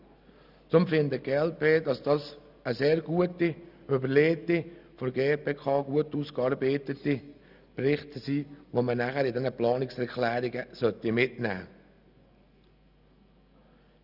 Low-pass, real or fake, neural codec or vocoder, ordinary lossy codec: 5.4 kHz; real; none; none